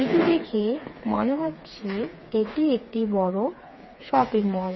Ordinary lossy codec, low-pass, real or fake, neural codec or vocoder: MP3, 24 kbps; 7.2 kHz; fake; codec, 16 kHz, 4 kbps, FreqCodec, smaller model